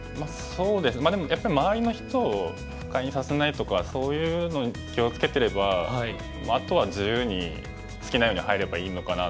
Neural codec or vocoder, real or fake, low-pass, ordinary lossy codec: none; real; none; none